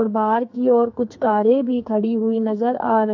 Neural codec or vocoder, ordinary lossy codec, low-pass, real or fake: codec, 44.1 kHz, 2.6 kbps, SNAC; AAC, 48 kbps; 7.2 kHz; fake